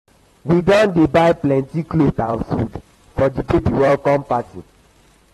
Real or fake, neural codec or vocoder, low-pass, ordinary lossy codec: fake; vocoder, 44.1 kHz, 128 mel bands, Pupu-Vocoder; 19.8 kHz; AAC, 32 kbps